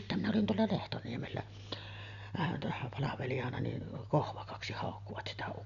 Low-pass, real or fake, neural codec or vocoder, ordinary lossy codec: 7.2 kHz; real; none; none